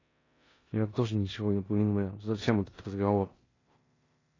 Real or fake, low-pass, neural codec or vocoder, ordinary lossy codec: fake; 7.2 kHz; codec, 16 kHz in and 24 kHz out, 0.9 kbps, LongCat-Audio-Codec, four codebook decoder; AAC, 32 kbps